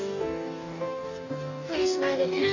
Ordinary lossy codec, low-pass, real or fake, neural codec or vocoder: none; 7.2 kHz; fake; codec, 44.1 kHz, 2.6 kbps, DAC